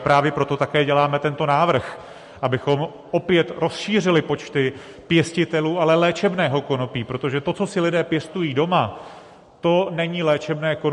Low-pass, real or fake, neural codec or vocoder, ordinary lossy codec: 14.4 kHz; real; none; MP3, 48 kbps